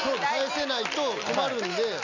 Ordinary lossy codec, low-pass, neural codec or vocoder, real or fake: none; 7.2 kHz; none; real